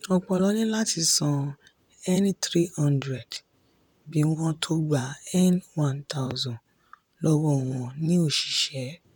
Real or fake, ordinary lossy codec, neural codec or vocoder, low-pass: fake; none; vocoder, 44.1 kHz, 128 mel bands, Pupu-Vocoder; 19.8 kHz